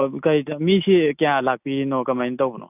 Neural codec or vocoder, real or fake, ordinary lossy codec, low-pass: none; real; none; 3.6 kHz